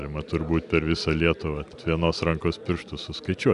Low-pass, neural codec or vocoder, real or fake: 10.8 kHz; none; real